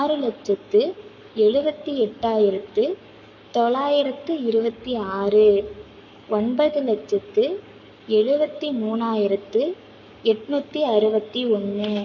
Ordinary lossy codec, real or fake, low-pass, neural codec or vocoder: none; fake; 7.2 kHz; codec, 44.1 kHz, 7.8 kbps, Pupu-Codec